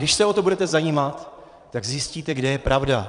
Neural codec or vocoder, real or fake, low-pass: vocoder, 22.05 kHz, 80 mel bands, Vocos; fake; 9.9 kHz